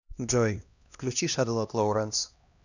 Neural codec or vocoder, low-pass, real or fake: codec, 16 kHz, 1 kbps, X-Codec, HuBERT features, trained on LibriSpeech; 7.2 kHz; fake